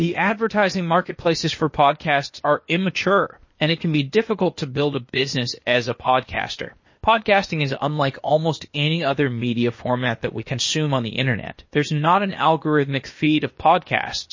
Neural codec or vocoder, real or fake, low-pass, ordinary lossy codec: codec, 16 kHz, 0.8 kbps, ZipCodec; fake; 7.2 kHz; MP3, 32 kbps